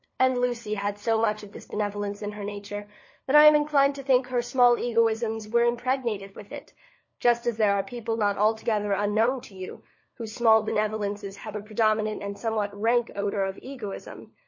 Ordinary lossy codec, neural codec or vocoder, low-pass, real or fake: MP3, 32 kbps; codec, 16 kHz, 4 kbps, FunCodec, trained on LibriTTS, 50 frames a second; 7.2 kHz; fake